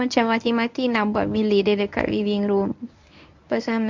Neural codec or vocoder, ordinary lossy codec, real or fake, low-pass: codec, 24 kHz, 0.9 kbps, WavTokenizer, medium speech release version 1; MP3, 64 kbps; fake; 7.2 kHz